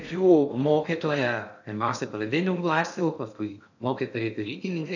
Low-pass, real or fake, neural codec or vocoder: 7.2 kHz; fake; codec, 16 kHz in and 24 kHz out, 0.6 kbps, FocalCodec, streaming, 2048 codes